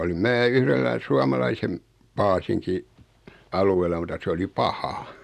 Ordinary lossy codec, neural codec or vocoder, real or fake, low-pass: none; none; real; 14.4 kHz